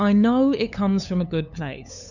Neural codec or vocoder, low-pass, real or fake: codec, 16 kHz, 8 kbps, FunCodec, trained on LibriTTS, 25 frames a second; 7.2 kHz; fake